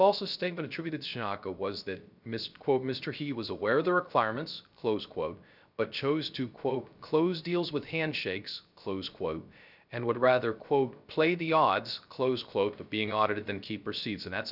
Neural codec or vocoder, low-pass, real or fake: codec, 16 kHz, 0.3 kbps, FocalCodec; 5.4 kHz; fake